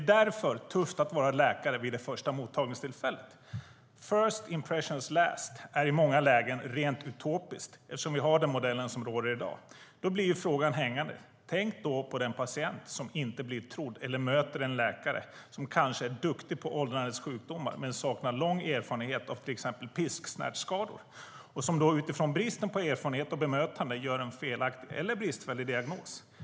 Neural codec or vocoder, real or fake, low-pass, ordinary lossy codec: none; real; none; none